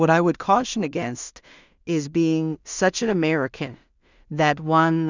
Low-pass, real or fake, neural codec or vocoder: 7.2 kHz; fake; codec, 16 kHz in and 24 kHz out, 0.4 kbps, LongCat-Audio-Codec, two codebook decoder